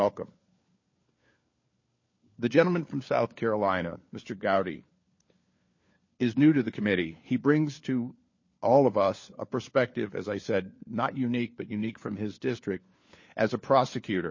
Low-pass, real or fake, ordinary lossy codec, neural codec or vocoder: 7.2 kHz; fake; MP3, 32 kbps; codec, 16 kHz, 4 kbps, FunCodec, trained on LibriTTS, 50 frames a second